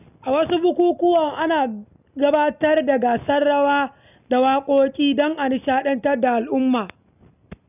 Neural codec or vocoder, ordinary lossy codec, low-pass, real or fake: none; none; 3.6 kHz; real